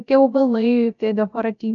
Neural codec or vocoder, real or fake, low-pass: codec, 16 kHz, 0.3 kbps, FocalCodec; fake; 7.2 kHz